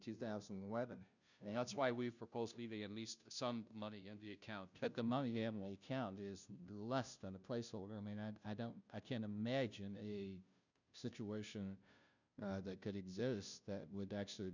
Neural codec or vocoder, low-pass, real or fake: codec, 16 kHz, 0.5 kbps, FunCodec, trained on Chinese and English, 25 frames a second; 7.2 kHz; fake